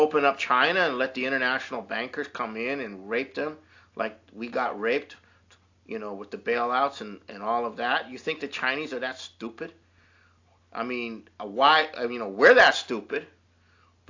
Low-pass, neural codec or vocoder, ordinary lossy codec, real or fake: 7.2 kHz; none; AAC, 48 kbps; real